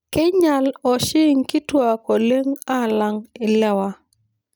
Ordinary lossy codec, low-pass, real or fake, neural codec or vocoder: none; none; real; none